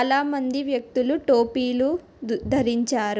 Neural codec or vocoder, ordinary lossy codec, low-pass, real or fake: none; none; none; real